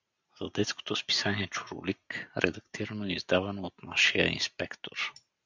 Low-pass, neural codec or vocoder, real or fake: 7.2 kHz; none; real